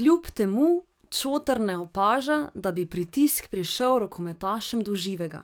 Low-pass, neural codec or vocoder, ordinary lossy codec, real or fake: none; codec, 44.1 kHz, 7.8 kbps, DAC; none; fake